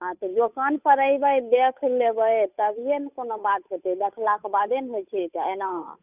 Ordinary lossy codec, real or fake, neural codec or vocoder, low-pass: none; fake; codec, 16 kHz, 8 kbps, FunCodec, trained on Chinese and English, 25 frames a second; 3.6 kHz